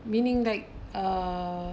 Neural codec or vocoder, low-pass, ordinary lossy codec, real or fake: none; none; none; real